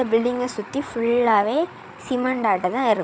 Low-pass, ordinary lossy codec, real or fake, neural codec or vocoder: none; none; fake; codec, 16 kHz, 8 kbps, FreqCodec, larger model